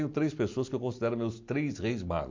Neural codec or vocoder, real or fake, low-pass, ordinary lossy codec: none; real; 7.2 kHz; MP3, 48 kbps